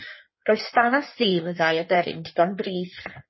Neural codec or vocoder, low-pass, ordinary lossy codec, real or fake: codec, 16 kHz in and 24 kHz out, 1.1 kbps, FireRedTTS-2 codec; 7.2 kHz; MP3, 24 kbps; fake